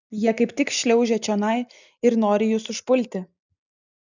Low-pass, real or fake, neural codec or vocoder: 7.2 kHz; real; none